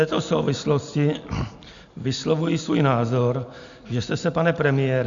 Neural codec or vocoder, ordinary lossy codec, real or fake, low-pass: none; MP3, 64 kbps; real; 7.2 kHz